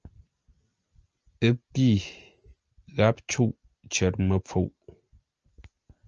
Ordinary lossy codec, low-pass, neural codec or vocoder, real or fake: Opus, 24 kbps; 7.2 kHz; none; real